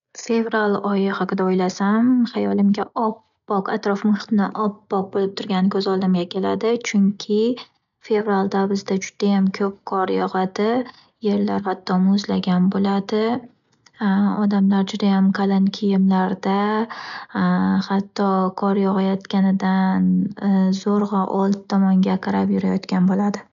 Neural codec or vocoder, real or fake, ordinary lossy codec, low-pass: none; real; none; 7.2 kHz